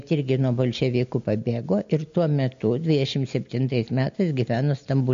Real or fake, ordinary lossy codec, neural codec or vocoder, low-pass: real; MP3, 48 kbps; none; 7.2 kHz